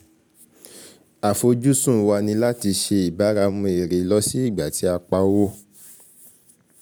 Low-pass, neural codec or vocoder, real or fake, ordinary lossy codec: none; none; real; none